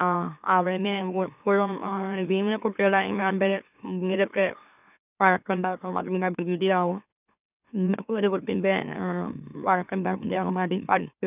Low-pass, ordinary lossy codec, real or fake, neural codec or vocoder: 3.6 kHz; none; fake; autoencoder, 44.1 kHz, a latent of 192 numbers a frame, MeloTTS